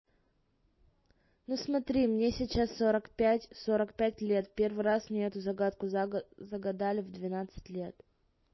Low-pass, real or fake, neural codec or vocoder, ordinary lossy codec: 7.2 kHz; real; none; MP3, 24 kbps